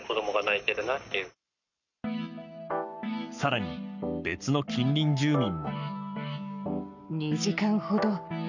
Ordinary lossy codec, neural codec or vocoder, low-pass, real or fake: none; codec, 44.1 kHz, 7.8 kbps, Pupu-Codec; 7.2 kHz; fake